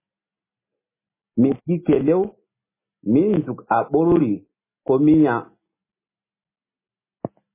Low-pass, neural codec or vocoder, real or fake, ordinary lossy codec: 3.6 kHz; none; real; MP3, 16 kbps